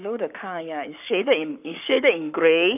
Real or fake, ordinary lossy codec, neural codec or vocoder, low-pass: fake; none; codec, 44.1 kHz, 7.8 kbps, Pupu-Codec; 3.6 kHz